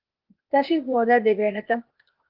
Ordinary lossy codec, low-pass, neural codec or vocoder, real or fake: Opus, 32 kbps; 5.4 kHz; codec, 16 kHz, 0.8 kbps, ZipCodec; fake